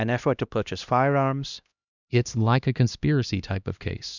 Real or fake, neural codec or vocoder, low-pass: fake; codec, 16 kHz, 1 kbps, X-Codec, HuBERT features, trained on LibriSpeech; 7.2 kHz